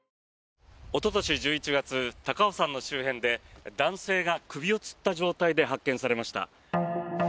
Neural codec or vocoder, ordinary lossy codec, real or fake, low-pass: none; none; real; none